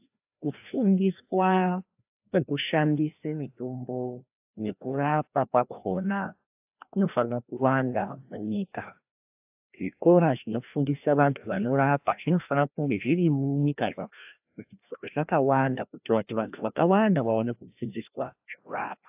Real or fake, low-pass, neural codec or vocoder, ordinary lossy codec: fake; 3.6 kHz; codec, 16 kHz, 1 kbps, FreqCodec, larger model; AAC, 32 kbps